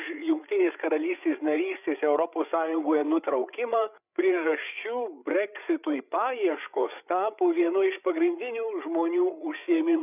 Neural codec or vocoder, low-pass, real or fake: codec, 16 kHz, 8 kbps, FreqCodec, larger model; 3.6 kHz; fake